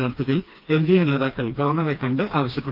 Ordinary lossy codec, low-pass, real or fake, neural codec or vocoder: Opus, 24 kbps; 5.4 kHz; fake; codec, 16 kHz, 2 kbps, FreqCodec, smaller model